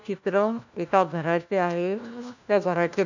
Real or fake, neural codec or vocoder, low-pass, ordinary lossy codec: fake; codec, 16 kHz, 1 kbps, FunCodec, trained on LibriTTS, 50 frames a second; 7.2 kHz; none